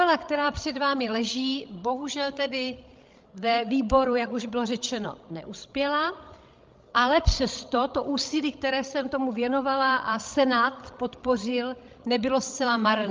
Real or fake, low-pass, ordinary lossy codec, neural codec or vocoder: fake; 7.2 kHz; Opus, 24 kbps; codec, 16 kHz, 16 kbps, FreqCodec, larger model